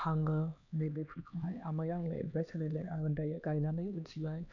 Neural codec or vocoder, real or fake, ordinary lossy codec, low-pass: codec, 16 kHz, 2 kbps, X-Codec, HuBERT features, trained on LibriSpeech; fake; none; 7.2 kHz